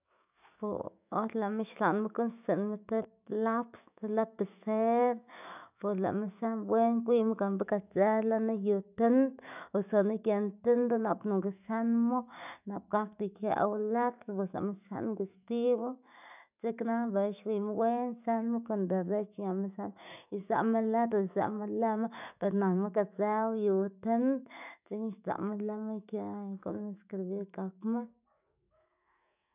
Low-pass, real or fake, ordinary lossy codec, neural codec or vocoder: 3.6 kHz; fake; none; autoencoder, 48 kHz, 128 numbers a frame, DAC-VAE, trained on Japanese speech